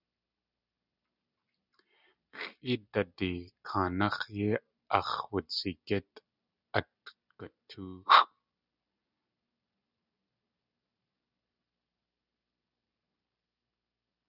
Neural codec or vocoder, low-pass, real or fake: none; 5.4 kHz; real